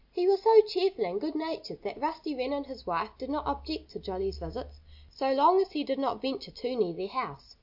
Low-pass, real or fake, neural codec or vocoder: 5.4 kHz; real; none